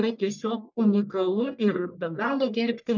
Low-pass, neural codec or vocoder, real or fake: 7.2 kHz; codec, 44.1 kHz, 1.7 kbps, Pupu-Codec; fake